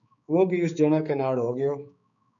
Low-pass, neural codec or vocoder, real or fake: 7.2 kHz; codec, 16 kHz, 4 kbps, X-Codec, HuBERT features, trained on balanced general audio; fake